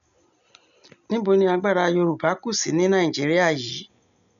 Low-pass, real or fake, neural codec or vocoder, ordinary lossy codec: 7.2 kHz; real; none; none